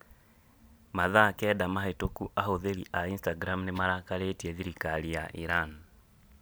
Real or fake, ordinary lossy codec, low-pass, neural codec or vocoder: real; none; none; none